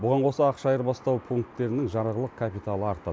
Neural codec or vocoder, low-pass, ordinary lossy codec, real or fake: none; none; none; real